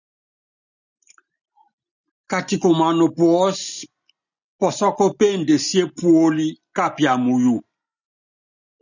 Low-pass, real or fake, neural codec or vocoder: 7.2 kHz; real; none